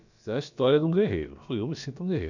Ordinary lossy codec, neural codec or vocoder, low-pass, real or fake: none; codec, 16 kHz, about 1 kbps, DyCAST, with the encoder's durations; 7.2 kHz; fake